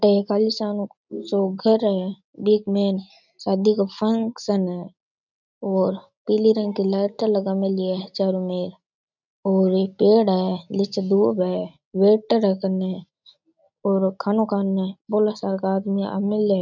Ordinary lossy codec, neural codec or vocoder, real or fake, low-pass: none; none; real; 7.2 kHz